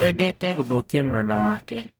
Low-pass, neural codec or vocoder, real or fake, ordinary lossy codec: none; codec, 44.1 kHz, 0.9 kbps, DAC; fake; none